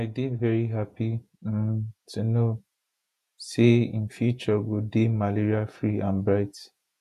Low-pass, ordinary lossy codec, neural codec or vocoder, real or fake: 14.4 kHz; none; vocoder, 48 kHz, 128 mel bands, Vocos; fake